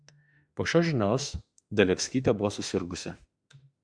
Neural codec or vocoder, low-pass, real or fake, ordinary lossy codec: autoencoder, 48 kHz, 32 numbers a frame, DAC-VAE, trained on Japanese speech; 9.9 kHz; fake; Opus, 64 kbps